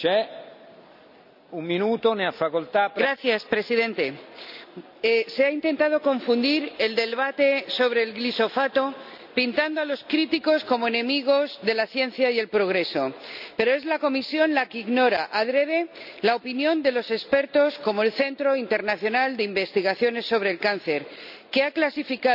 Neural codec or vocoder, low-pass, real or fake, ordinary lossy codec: none; 5.4 kHz; real; none